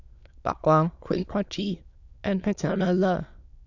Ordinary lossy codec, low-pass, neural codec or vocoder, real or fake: none; 7.2 kHz; autoencoder, 22.05 kHz, a latent of 192 numbers a frame, VITS, trained on many speakers; fake